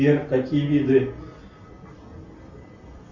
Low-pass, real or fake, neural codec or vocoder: 7.2 kHz; real; none